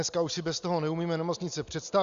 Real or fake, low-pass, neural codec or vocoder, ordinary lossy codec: real; 7.2 kHz; none; Opus, 64 kbps